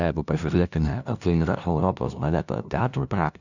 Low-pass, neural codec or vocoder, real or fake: 7.2 kHz; codec, 16 kHz, 0.5 kbps, FunCodec, trained on LibriTTS, 25 frames a second; fake